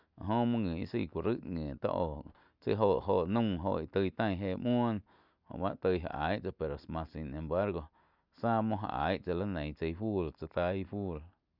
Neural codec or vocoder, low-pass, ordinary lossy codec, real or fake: none; 5.4 kHz; none; real